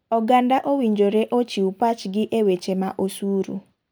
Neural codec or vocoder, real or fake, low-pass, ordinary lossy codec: none; real; none; none